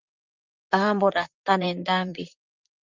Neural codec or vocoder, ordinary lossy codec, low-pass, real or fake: vocoder, 44.1 kHz, 128 mel bands, Pupu-Vocoder; Opus, 24 kbps; 7.2 kHz; fake